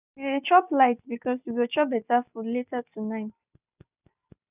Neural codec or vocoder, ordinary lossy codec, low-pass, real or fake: none; none; 3.6 kHz; real